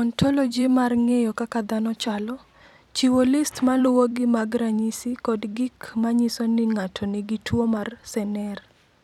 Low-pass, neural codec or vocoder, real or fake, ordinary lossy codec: 19.8 kHz; vocoder, 44.1 kHz, 128 mel bands every 256 samples, BigVGAN v2; fake; none